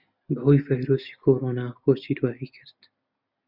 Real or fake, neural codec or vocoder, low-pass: real; none; 5.4 kHz